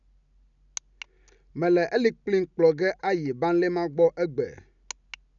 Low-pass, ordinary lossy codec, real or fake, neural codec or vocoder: 7.2 kHz; none; real; none